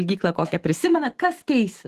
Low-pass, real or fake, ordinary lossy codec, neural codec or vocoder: 14.4 kHz; fake; Opus, 16 kbps; autoencoder, 48 kHz, 128 numbers a frame, DAC-VAE, trained on Japanese speech